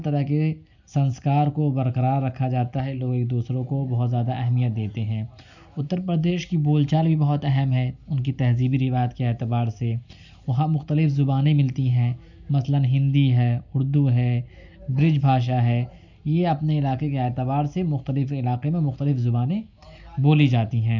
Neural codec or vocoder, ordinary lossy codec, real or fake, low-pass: none; none; real; 7.2 kHz